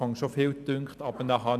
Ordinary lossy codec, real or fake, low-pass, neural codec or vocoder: none; real; 14.4 kHz; none